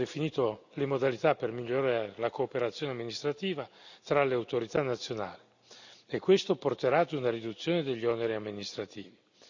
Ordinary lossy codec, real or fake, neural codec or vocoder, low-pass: none; real; none; 7.2 kHz